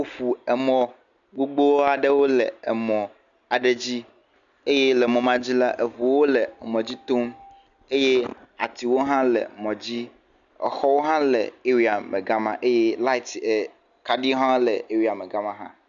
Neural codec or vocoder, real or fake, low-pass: none; real; 7.2 kHz